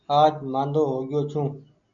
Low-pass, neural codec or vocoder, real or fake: 7.2 kHz; none; real